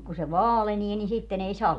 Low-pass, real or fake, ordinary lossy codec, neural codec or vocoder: 10.8 kHz; real; none; none